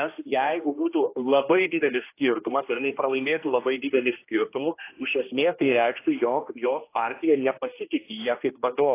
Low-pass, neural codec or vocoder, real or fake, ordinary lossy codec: 3.6 kHz; codec, 16 kHz, 1 kbps, X-Codec, HuBERT features, trained on general audio; fake; AAC, 24 kbps